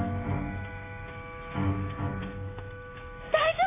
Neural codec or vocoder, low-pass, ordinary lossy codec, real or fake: autoencoder, 48 kHz, 128 numbers a frame, DAC-VAE, trained on Japanese speech; 3.6 kHz; AAC, 16 kbps; fake